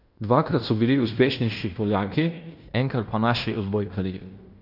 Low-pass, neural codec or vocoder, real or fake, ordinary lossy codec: 5.4 kHz; codec, 16 kHz in and 24 kHz out, 0.9 kbps, LongCat-Audio-Codec, fine tuned four codebook decoder; fake; none